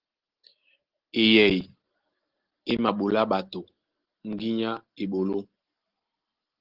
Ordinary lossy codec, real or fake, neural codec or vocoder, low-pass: Opus, 16 kbps; real; none; 5.4 kHz